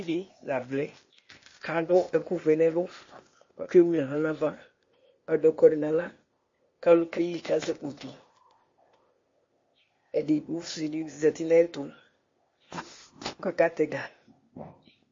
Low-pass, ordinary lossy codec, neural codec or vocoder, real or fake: 7.2 kHz; MP3, 32 kbps; codec, 16 kHz, 0.8 kbps, ZipCodec; fake